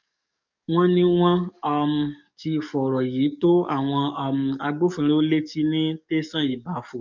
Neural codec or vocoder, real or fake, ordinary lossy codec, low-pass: codec, 44.1 kHz, 7.8 kbps, DAC; fake; none; 7.2 kHz